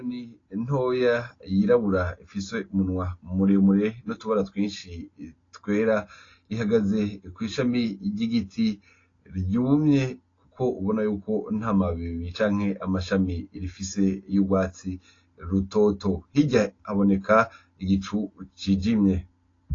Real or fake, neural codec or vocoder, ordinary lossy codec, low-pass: real; none; AAC, 32 kbps; 7.2 kHz